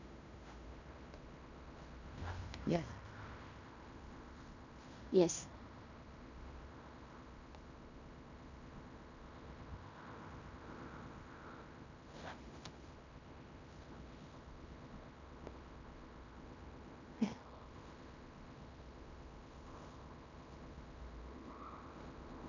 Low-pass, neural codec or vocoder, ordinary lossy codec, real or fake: 7.2 kHz; codec, 16 kHz in and 24 kHz out, 0.9 kbps, LongCat-Audio-Codec, fine tuned four codebook decoder; none; fake